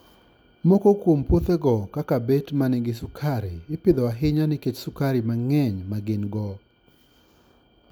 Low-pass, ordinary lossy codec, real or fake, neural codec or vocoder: none; none; real; none